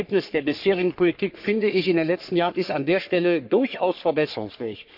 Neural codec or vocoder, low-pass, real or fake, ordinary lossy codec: codec, 44.1 kHz, 3.4 kbps, Pupu-Codec; 5.4 kHz; fake; none